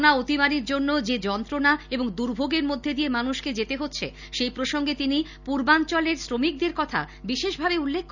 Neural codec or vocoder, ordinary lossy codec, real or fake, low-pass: none; none; real; 7.2 kHz